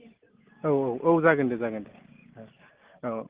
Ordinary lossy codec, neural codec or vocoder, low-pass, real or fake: Opus, 16 kbps; none; 3.6 kHz; real